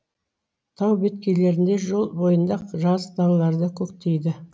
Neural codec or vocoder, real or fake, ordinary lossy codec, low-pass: none; real; none; none